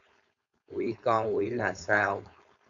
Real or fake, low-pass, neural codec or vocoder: fake; 7.2 kHz; codec, 16 kHz, 4.8 kbps, FACodec